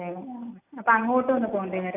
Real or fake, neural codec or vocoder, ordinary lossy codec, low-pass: real; none; AAC, 32 kbps; 3.6 kHz